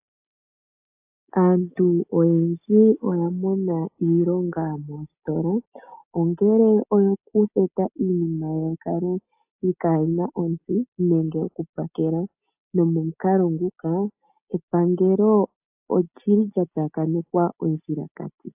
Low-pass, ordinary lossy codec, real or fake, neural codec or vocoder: 3.6 kHz; AAC, 32 kbps; real; none